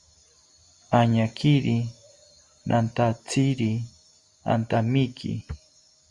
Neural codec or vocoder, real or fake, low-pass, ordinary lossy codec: none; real; 10.8 kHz; Opus, 64 kbps